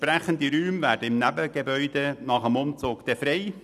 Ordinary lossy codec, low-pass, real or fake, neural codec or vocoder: none; 14.4 kHz; real; none